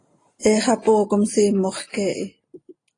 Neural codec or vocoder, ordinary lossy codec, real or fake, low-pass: none; AAC, 32 kbps; real; 9.9 kHz